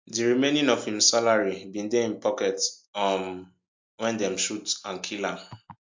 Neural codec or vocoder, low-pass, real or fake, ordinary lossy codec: none; 7.2 kHz; real; MP3, 48 kbps